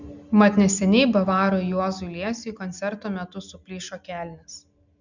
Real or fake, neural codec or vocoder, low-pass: real; none; 7.2 kHz